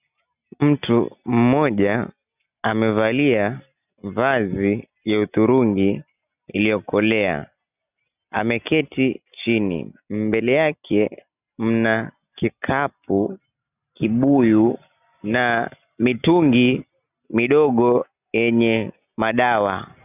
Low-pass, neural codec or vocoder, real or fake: 3.6 kHz; none; real